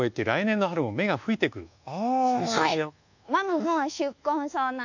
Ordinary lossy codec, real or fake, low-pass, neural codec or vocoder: none; fake; 7.2 kHz; codec, 24 kHz, 1.2 kbps, DualCodec